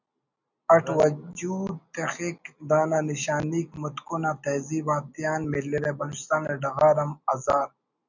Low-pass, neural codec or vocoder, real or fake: 7.2 kHz; none; real